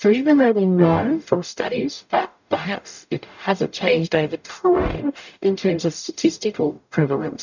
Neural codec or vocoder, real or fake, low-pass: codec, 44.1 kHz, 0.9 kbps, DAC; fake; 7.2 kHz